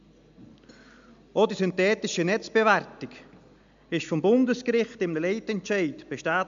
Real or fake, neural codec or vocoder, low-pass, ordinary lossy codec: real; none; 7.2 kHz; MP3, 64 kbps